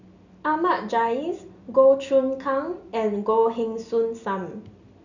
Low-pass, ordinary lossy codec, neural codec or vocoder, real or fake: 7.2 kHz; none; none; real